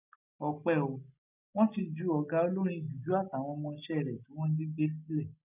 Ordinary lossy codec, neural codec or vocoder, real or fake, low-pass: none; none; real; 3.6 kHz